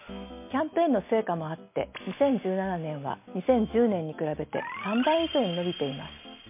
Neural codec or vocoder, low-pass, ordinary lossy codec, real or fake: none; 3.6 kHz; MP3, 24 kbps; real